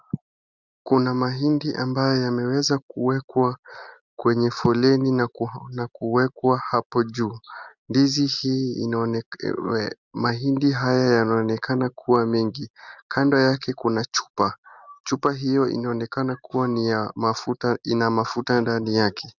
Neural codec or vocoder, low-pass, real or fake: none; 7.2 kHz; real